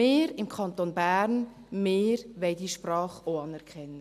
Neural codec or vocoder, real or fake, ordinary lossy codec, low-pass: none; real; none; 14.4 kHz